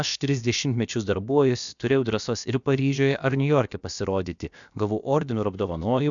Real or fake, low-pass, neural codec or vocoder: fake; 7.2 kHz; codec, 16 kHz, about 1 kbps, DyCAST, with the encoder's durations